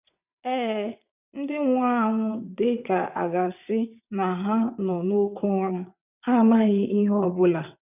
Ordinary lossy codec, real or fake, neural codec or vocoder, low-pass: none; fake; vocoder, 22.05 kHz, 80 mel bands, WaveNeXt; 3.6 kHz